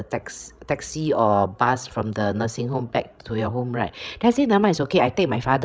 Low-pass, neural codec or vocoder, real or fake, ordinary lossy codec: none; codec, 16 kHz, 16 kbps, FreqCodec, larger model; fake; none